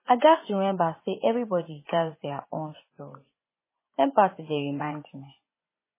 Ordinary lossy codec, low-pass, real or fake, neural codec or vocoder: MP3, 16 kbps; 3.6 kHz; fake; vocoder, 24 kHz, 100 mel bands, Vocos